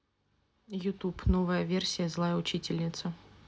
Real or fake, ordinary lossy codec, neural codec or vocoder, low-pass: real; none; none; none